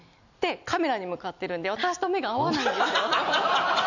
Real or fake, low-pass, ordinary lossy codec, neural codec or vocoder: real; 7.2 kHz; none; none